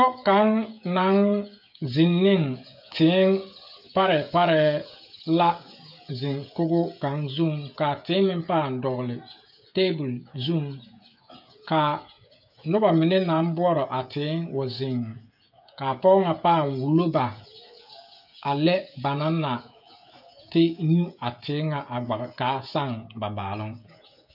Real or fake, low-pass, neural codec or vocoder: fake; 5.4 kHz; codec, 16 kHz, 16 kbps, FreqCodec, smaller model